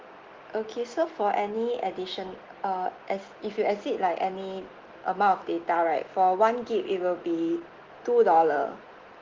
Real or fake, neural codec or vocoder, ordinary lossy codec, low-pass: real; none; Opus, 16 kbps; 7.2 kHz